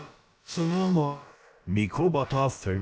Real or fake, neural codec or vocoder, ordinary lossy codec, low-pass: fake; codec, 16 kHz, about 1 kbps, DyCAST, with the encoder's durations; none; none